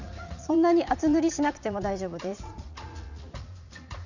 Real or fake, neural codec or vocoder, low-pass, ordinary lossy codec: fake; vocoder, 44.1 kHz, 80 mel bands, Vocos; 7.2 kHz; none